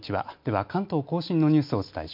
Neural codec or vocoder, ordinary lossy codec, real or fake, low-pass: none; MP3, 48 kbps; real; 5.4 kHz